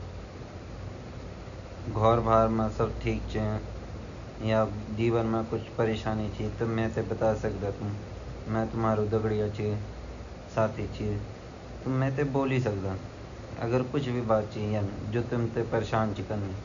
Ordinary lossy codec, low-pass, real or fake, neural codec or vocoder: none; 7.2 kHz; real; none